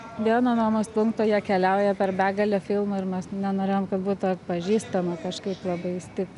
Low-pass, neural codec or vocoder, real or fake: 10.8 kHz; none; real